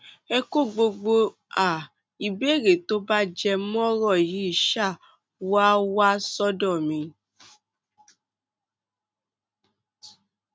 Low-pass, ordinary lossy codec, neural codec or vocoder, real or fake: none; none; none; real